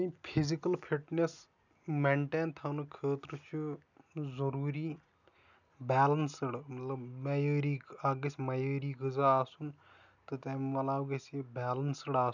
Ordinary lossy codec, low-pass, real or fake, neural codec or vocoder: none; 7.2 kHz; real; none